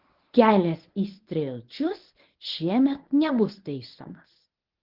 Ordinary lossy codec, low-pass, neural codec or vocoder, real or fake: Opus, 16 kbps; 5.4 kHz; codec, 24 kHz, 0.9 kbps, WavTokenizer, medium speech release version 1; fake